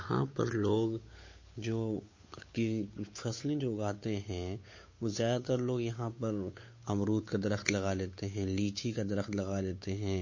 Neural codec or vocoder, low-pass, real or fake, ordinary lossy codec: codec, 16 kHz, 8 kbps, FunCodec, trained on Chinese and English, 25 frames a second; 7.2 kHz; fake; MP3, 32 kbps